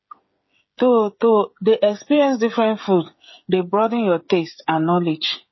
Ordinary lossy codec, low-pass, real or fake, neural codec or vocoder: MP3, 24 kbps; 7.2 kHz; fake; codec, 16 kHz, 16 kbps, FreqCodec, smaller model